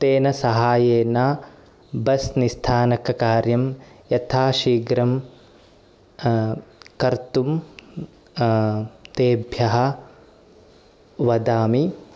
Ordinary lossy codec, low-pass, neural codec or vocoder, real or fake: none; none; none; real